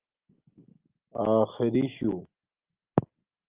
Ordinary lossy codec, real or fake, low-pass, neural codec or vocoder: Opus, 24 kbps; real; 3.6 kHz; none